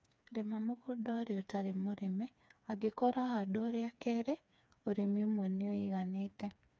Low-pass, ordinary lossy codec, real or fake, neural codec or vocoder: none; none; fake; codec, 16 kHz, 4 kbps, FreqCodec, smaller model